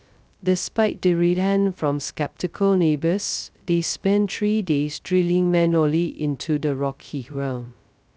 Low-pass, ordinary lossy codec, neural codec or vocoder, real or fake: none; none; codec, 16 kHz, 0.2 kbps, FocalCodec; fake